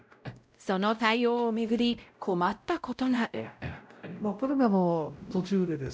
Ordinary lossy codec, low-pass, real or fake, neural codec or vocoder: none; none; fake; codec, 16 kHz, 0.5 kbps, X-Codec, WavLM features, trained on Multilingual LibriSpeech